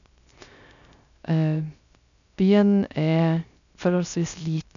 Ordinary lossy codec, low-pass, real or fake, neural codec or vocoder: none; 7.2 kHz; fake; codec, 16 kHz, 0.3 kbps, FocalCodec